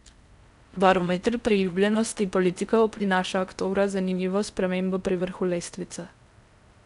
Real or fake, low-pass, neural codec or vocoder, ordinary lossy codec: fake; 10.8 kHz; codec, 16 kHz in and 24 kHz out, 0.6 kbps, FocalCodec, streaming, 4096 codes; none